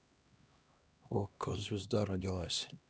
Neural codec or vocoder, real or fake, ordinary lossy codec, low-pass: codec, 16 kHz, 1 kbps, X-Codec, HuBERT features, trained on LibriSpeech; fake; none; none